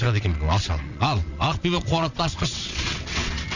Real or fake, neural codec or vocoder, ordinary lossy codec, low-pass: fake; vocoder, 22.05 kHz, 80 mel bands, WaveNeXt; none; 7.2 kHz